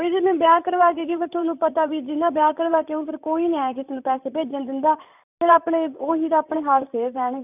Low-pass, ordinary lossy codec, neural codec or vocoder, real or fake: 3.6 kHz; none; vocoder, 44.1 kHz, 128 mel bands, Pupu-Vocoder; fake